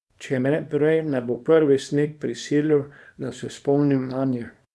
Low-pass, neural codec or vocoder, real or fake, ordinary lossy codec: none; codec, 24 kHz, 0.9 kbps, WavTokenizer, small release; fake; none